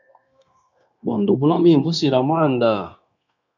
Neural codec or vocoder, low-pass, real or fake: codec, 16 kHz, 0.9 kbps, LongCat-Audio-Codec; 7.2 kHz; fake